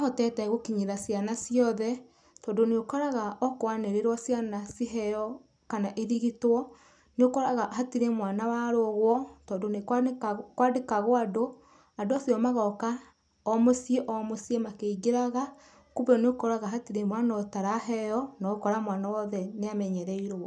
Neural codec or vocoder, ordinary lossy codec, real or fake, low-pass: none; none; real; 9.9 kHz